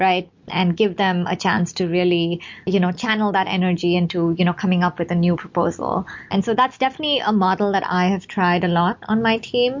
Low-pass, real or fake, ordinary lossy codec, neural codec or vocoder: 7.2 kHz; real; MP3, 48 kbps; none